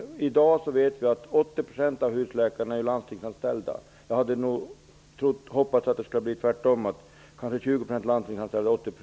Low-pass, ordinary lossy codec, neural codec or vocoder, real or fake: none; none; none; real